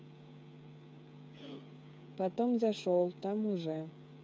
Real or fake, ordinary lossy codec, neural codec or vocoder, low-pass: fake; none; codec, 16 kHz, 8 kbps, FreqCodec, smaller model; none